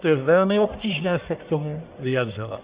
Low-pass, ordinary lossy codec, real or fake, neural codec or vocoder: 3.6 kHz; Opus, 64 kbps; fake; codec, 44.1 kHz, 1.7 kbps, Pupu-Codec